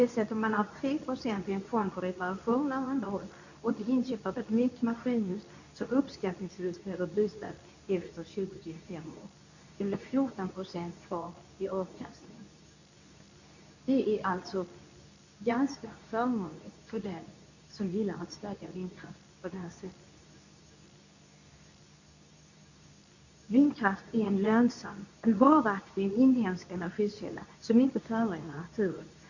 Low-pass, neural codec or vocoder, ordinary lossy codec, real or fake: 7.2 kHz; codec, 24 kHz, 0.9 kbps, WavTokenizer, medium speech release version 2; none; fake